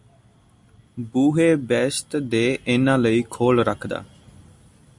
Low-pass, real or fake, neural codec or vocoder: 10.8 kHz; real; none